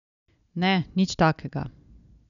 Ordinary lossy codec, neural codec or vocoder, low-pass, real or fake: none; none; 7.2 kHz; real